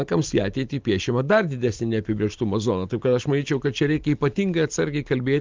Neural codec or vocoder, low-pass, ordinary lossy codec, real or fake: none; 7.2 kHz; Opus, 32 kbps; real